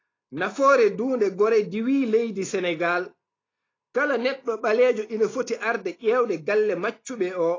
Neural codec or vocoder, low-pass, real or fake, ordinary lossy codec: none; 7.2 kHz; real; AAC, 32 kbps